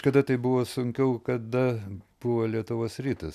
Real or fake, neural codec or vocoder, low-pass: real; none; 14.4 kHz